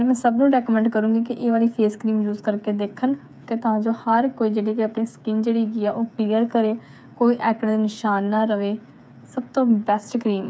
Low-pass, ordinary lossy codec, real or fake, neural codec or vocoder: none; none; fake; codec, 16 kHz, 8 kbps, FreqCodec, smaller model